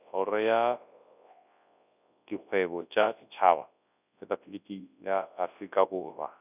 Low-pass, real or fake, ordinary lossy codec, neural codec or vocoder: 3.6 kHz; fake; none; codec, 24 kHz, 0.9 kbps, WavTokenizer, large speech release